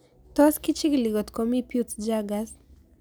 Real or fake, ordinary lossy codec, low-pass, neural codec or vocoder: real; none; none; none